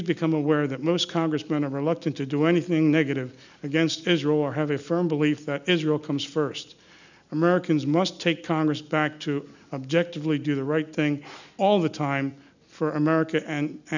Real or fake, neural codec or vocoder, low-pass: real; none; 7.2 kHz